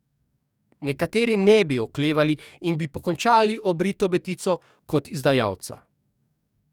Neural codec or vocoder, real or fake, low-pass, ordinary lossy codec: codec, 44.1 kHz, 2.6 kbps, DAC; fake; 19.8 kHz; none